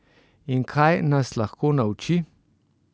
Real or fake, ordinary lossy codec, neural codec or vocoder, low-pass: real; none; none; none